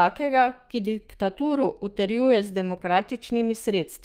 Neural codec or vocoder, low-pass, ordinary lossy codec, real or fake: codec, 32 kHz, 1.9 kbps, SNAC; 14.4 kHz; Opus, 32 kbps; fake